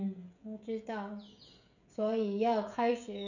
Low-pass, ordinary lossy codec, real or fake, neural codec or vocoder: 7.2 kHz; none; fake; codec, 16 kHz, 16 kbps, FreqCodec, smaller model